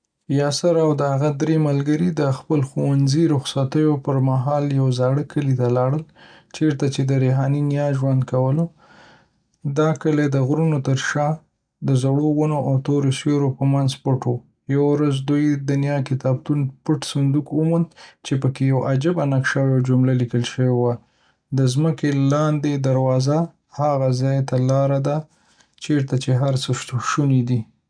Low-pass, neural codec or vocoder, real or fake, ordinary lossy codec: 9.9 kHz; none; real; none